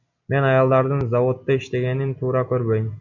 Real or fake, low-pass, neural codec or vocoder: real; 7.2 kHz; none